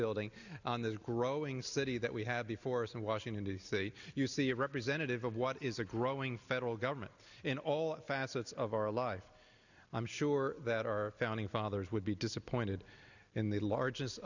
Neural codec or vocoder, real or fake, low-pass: none; real; 7.2 kHz